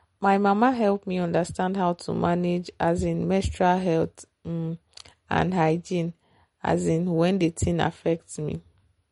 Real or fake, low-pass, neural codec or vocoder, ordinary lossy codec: real; 10.8 kHz; none; MP3, 48 kbps